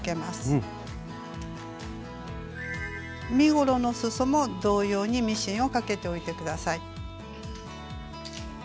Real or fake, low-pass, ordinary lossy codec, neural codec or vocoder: real; none; none; none